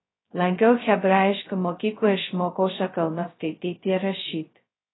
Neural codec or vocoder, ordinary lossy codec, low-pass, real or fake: codec, 16 kHz, 0.2 kbps, FocalCodec; AAC, 16 kbps; 7.2 kHz; fake